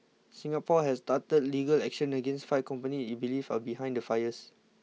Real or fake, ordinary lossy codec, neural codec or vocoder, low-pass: real; none; none; none